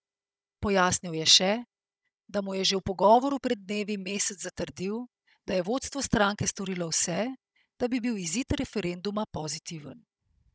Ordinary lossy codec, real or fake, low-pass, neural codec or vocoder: none; fake; none; codec, 16 kHz, 16 kbps, FunCodec, trained on Chinese and English, 50 frames a second